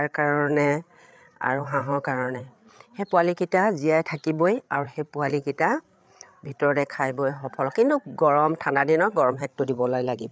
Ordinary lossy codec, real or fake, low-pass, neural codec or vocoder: none; fake; none; codec, 16 kHz, 8 kbps, FreqCodec, larger model